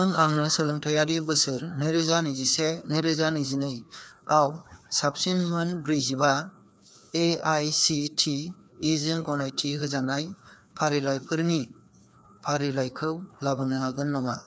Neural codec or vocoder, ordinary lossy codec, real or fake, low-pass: codec, 16 kHz, 2 kbps, FreqCodec, larger model; none; fake; none